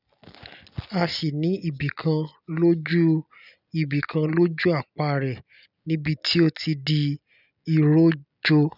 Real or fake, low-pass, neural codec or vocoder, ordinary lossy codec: real; 5.4 kHz; none; none